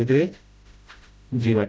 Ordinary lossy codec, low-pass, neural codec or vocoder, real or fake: none; none; codec, 16 kHz, 0.5 kbps, FreqCodec, smaller model; fake